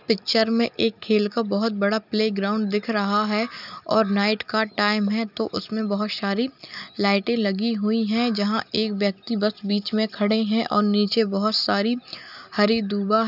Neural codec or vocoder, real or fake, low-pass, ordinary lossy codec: none; real; 5.4 kHz; none